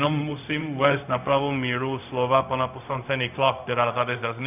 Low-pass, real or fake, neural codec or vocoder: 3.6 kHz; fake; codec, 16 kHz, 0.4 kbps, LongCat-Audio-Codec